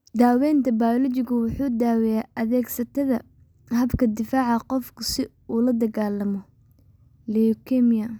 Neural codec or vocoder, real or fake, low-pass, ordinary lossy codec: none; real; none; none